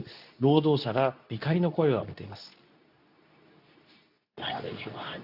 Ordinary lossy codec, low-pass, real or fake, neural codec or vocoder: AAC, 48 kbps; 5.4 kHz; fake; codec, 24 kHz, 0.9 kbps, WavTokenizer, medium speech release version 2